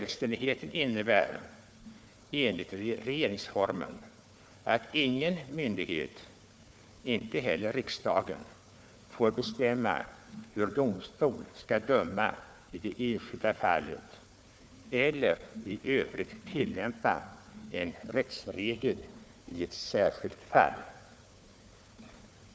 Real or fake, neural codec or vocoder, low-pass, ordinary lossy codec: fake; codec, 16 kHz, 4 kbps, FunCodec, trained on Chinese and English, 50 frames a second; none; none